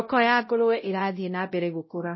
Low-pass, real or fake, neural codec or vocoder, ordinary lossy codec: 7.2 kHz; fake; codec, 16 kHz, 0.5 kbps, X-Codec, WavLM features, trained on Multilingual LibriSpeech; MP3, 24 kbps